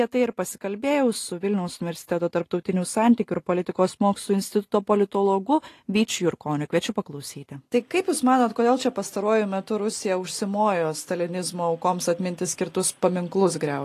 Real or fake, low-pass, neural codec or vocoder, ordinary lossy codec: real; 14.4 kHz; none; AAC, 48 kbps